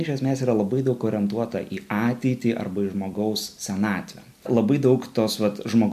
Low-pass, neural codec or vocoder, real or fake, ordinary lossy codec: 14.4 kHz; none; real; MP3, 64 kbps